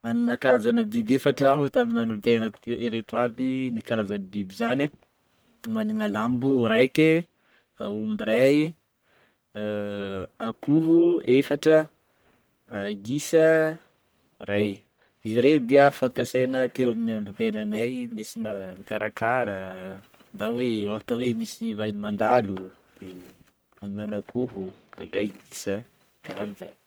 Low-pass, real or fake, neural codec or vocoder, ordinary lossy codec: none; fake; codec, 44.1 kHz, 1.7 kbps, Pupu-Codec; none